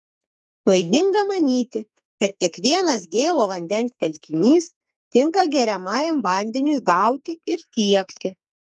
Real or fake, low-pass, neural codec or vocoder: fake; 10.8 kHz; codec, 44.1 kHz, 2.6 kbps, SNAC